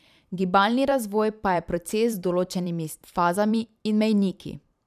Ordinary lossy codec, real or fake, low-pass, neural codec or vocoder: none; fake; 14.4 kHz; vocoder, 44.1 kHz, 128 mel bands every 512 samples, BigVGAN v2